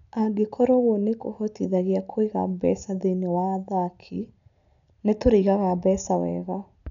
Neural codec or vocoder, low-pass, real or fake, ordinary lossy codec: none; 7.2 kHz; real; none